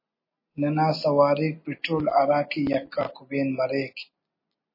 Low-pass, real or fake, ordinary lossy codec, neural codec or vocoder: 5.4 kHz; real; MP3, 24 kbps; none